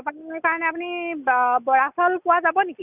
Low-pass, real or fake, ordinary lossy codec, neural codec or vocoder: 3.6 kHz; real; none; none